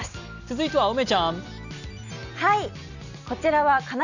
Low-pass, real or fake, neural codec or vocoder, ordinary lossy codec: 7.2 kHz; real; none; none